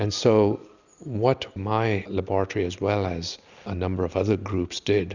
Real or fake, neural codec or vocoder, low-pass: real; none; 7.2 kHz